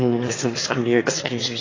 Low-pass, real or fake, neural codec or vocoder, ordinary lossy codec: 7.2 kHz; fake; autoencoder, 22.05 kHz, a latent of 192 numbers a frame, VITS, trained on one speaker; AAC, 32 kbps